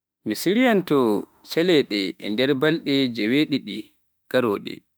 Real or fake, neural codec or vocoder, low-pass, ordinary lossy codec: fake; autoencoder, 48 kHz, 32 numbers a frame, DAC-VAE, trained on Japanese speech; none; none